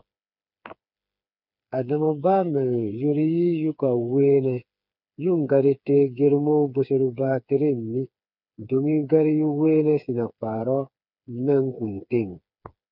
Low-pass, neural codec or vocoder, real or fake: 5.4 kHz; codec, 16 kHz, 4 kbps, FreqCodec, smaller model; fake